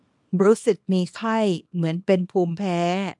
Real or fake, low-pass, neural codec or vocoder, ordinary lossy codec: fake; 10.8 kHz; codec, 24 kHz, 0.9 kbps, WavTokenizer, small release; MP3, 64 kbps